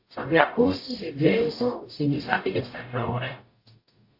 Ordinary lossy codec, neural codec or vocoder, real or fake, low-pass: AAC, 32 kbps; codec, 44.1 kHz, 0.9 kbps, DAC; fake; 5.4 kHz